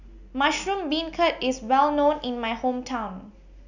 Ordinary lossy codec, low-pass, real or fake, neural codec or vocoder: none; 7.2 kHz; real; none